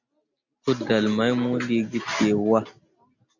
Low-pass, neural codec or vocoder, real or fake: 7.2 kHz; none; real